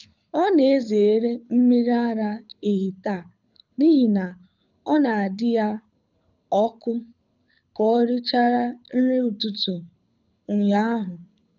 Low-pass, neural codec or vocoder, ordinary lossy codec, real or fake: 7.2 kHz; codec, 24 kHz, 6 kbps, HILCodec; none; fake